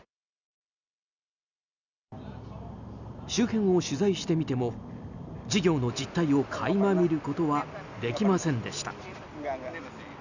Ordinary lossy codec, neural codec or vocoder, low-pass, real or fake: none; none; 7.2 kHz; real